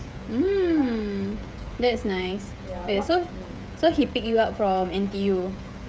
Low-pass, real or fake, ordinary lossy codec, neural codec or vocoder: none; fake; none; codec, 16 kHz, 16 kbps, FreqCodec, smaller model